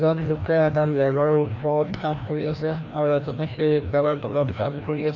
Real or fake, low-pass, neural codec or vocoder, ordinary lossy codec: fake; 7.2 kHz; codec, 16 kHz, 1 kbps, FreqCodec, larger model; none